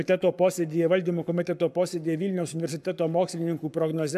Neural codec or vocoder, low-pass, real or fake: codec, 44.1 kHz, 7.8 kbps, Pupu-Codec; 14.4 kHz; fake